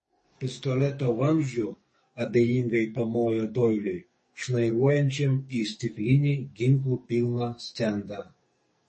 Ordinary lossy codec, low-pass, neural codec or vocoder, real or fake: MP3, 32 kbps; 10.8 kHz; codec, 44.1 kHz, 2.6 kbps, SNAC; fake